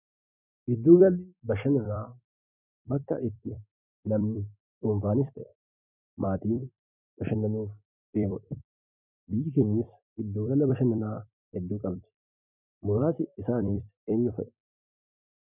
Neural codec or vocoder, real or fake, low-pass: vocoder, 44.1 kHz, 128 mel bands every 256 samples, BigVGAN v2; fake; 3.6 kHz